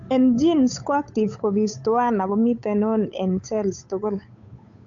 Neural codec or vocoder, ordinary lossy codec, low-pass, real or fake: codec, 16 kHz, 8 kbps, FunCodec, trained on Chinese and English, 25 frames a second; AAC, 64 kbps; 7.2 kHz; fake